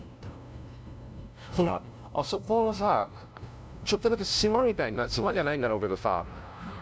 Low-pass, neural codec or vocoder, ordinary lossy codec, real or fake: none; codec, 16 kHz, 0.5 kbps, FunCodec, trained on LibriTTS, 25 frames a second; none; fake